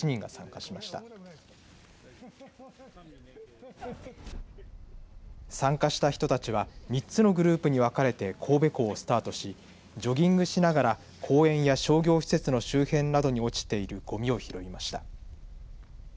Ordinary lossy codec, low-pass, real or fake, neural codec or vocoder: none; none; real; none